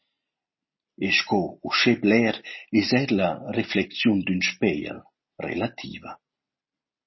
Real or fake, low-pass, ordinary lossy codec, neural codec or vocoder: real; 7.2 kHz; MP3, 24 kbps; none